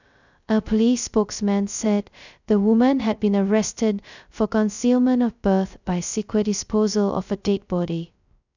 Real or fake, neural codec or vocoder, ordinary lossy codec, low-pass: fake; codec, 16 kHz, 0.2 kbps, FocalCodec; none; 7.2 kHz